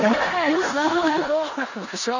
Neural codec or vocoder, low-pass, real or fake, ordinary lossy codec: codec, 16 kHz in and 24 kHz out, 0.9 kbps, LongCat-Audio-Codec, four codebook decoder; 7.2 kHz; fake; none